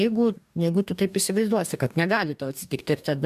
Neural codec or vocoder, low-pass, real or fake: codec, 44.1 kHz, 2.6 kbps, DAC; 14.4 kHz; fake